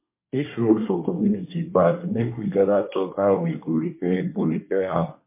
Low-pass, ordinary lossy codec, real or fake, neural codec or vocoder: 3.6 kHz; MP3, 24 kbps; fake; codec, 24 kHz, 1 kbps, SNAC